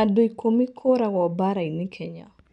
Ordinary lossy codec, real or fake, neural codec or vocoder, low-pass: none; real; none; 10.8 kHz